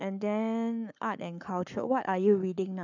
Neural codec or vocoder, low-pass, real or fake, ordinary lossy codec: codec, 44.1 kHz, 7.8 kbps, Pupu-Codec; 7.2 kHz; fake; none